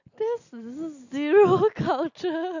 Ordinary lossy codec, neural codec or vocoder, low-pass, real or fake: none; none; 7.2 kHz; real